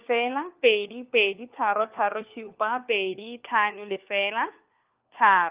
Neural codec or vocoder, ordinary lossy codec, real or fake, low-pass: codec, 16 kHz, 4 kbps, FunCodec, trained on LibriTTS, 50 frames a second; Opus, 24 kbps; fake; 3.6 kHz